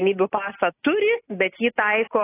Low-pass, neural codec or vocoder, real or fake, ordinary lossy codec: 3.6 kHz; none; real; AAC, 24 kbps